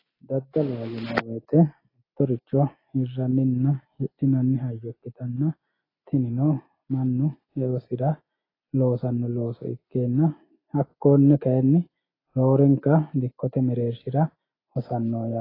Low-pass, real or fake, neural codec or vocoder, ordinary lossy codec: 5.4 kHz; real; none; AAC, 24 kbps